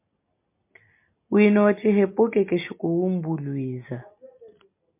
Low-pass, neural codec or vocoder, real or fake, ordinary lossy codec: 3.6 kHz; none; real; MP3, 24 kbps